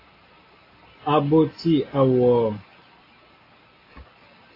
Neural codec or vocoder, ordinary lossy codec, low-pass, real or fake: none; AAC, 24 kbps; 5.4 kHz; real